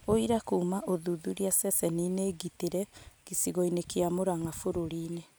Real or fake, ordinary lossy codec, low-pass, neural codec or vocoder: real; none; none; none